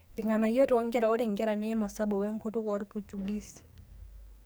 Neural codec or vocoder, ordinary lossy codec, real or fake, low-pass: codec, 44.1 kHz, 2.6 kbps, SNAC; none; fake; none